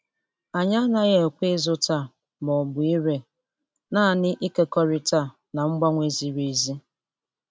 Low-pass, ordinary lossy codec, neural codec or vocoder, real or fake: none; none; none; real